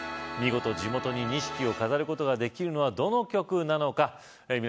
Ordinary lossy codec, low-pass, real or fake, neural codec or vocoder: none; none; real; none